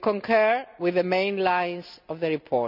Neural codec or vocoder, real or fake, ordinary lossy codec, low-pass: none; real; none; 5.4 kHz